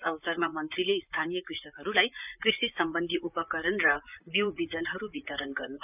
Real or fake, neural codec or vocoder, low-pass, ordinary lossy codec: fake; vocoder, 44.1 kHz, 128 mel bands, Pupu-Vocoder; 3.6 kHz; none